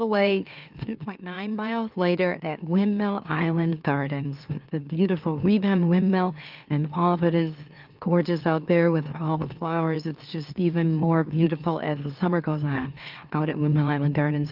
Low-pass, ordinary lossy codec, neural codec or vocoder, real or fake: 5.4 kHz; Opus, 24 kbps; autoencoder, 44.1 kHz, a latent of 192 numbers a frame, MeloTTS; fake